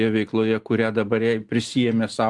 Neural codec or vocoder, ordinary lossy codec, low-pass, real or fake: none; Opus, 24 kbps; 10.8 kHz; real